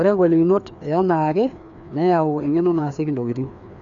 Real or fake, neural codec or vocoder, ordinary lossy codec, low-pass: fake; codec, 16 kHz, 2 kbps, FreqCodec, larger model; none; 7.2 kHz